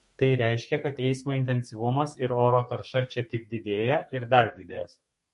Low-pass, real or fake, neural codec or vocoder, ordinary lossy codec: 14.4 kHz; fake; codec, 32 kHz, 1.9 kbps, SNAC; MP3, 48 kbps